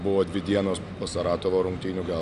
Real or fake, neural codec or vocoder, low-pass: real; none; 10.8 kHz